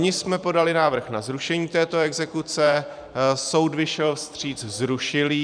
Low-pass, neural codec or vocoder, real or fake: 9.9 kHz; vocoder, 44.1 kHz, 128 mel bands every 512 samples, BigVGAN v2; fake